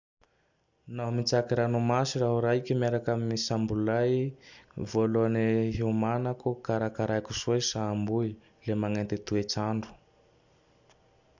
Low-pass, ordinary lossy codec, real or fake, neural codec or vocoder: 7.2 kHz; none; real; none